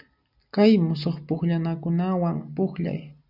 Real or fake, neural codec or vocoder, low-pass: real; none; 5.4 kHz